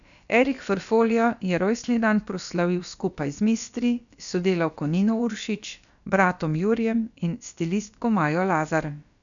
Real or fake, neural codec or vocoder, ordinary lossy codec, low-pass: fake; codec, 16 kHz, about 1 kbps, DyCAST, with the encoder's durations; none; 7.2 kHz